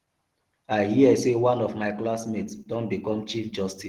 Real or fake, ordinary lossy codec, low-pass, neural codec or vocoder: real; Opus, 16 kbps; 19.8 kHz; none